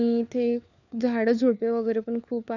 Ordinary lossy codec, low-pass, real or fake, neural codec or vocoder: none; 7.2 kHz; fake; codec, 16 kHz, 4 kbps, FunCodec, trained on LibriTTS, 50 frames a second